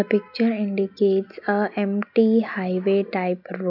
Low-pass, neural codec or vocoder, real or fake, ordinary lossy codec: 5.4 kHz; none; real; none